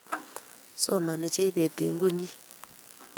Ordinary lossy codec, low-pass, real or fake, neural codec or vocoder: none; none; fake; codec, 44.1 kHz, 2.6 kbps, SNAC